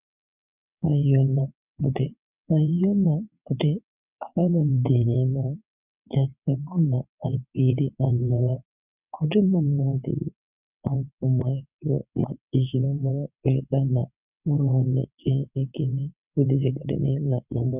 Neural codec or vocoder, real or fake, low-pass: vocoder, 22.05 kHz, 80 mel bands, WaveNeXt; fake; 3.6 kHz